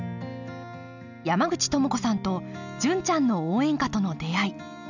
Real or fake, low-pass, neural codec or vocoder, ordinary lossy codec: real; 7.2 kHz; none; none